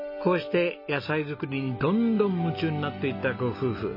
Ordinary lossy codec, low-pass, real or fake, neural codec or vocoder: MP3, 24 kbps; 5.4 kHz; real; none